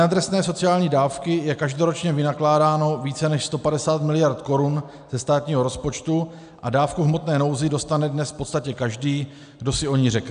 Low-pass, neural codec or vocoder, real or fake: 9.9 kHz; none; real